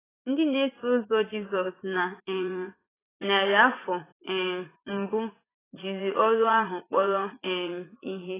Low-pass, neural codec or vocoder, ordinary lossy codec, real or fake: 3.6 kHz; vocoder, 44.1 kHz, 80 mel bands, Vocos; AAC, 16 kbps; fake